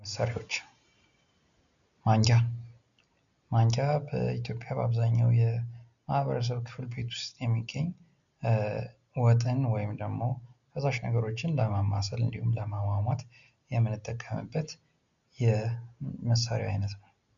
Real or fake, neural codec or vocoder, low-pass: real; none; 7.2 kHz